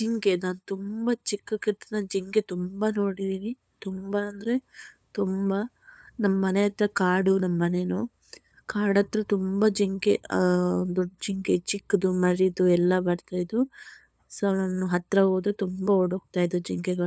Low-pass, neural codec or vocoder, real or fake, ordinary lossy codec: none; codec, 16 kHz, 8 kbps, FunCodec, trained on LibriTTS, 25 frames a second; fake; none